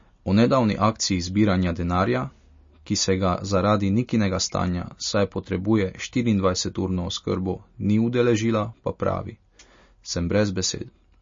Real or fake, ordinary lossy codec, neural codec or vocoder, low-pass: real; MP3, 32 kbps; none; 7.2 kHz